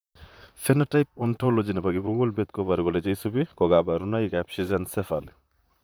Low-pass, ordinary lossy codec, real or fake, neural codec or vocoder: none; none; fake; vocoder, 44.1 kHz, 128 mel bands, Pupu-Vocoder